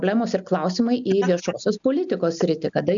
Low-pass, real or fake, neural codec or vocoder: 7.2 kHz; real; none